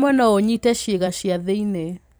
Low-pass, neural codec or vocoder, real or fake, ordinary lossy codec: none; vocoder, 44.1 kHz, 128 mel bands every 256 samples, BigVGAN v2; fake; none